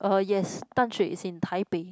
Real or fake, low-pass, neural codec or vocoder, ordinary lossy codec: real; none; none; none